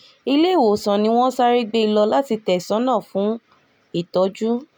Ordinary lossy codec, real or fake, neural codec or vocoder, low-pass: none; real; none; none